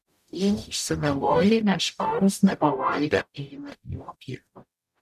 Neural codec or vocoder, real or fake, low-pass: codec, 44.1 kHz, 0.9 kbps, DAC; fake; 14.4 kHz